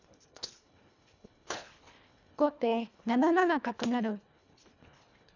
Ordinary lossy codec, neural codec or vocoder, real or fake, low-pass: none; codec, 24 kHz, 1.5 kbps, HILCodec; fake; 7.2 kHz